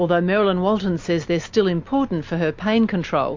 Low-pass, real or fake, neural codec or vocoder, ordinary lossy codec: 7.2 kHz; real; none; MP3, 48 kbps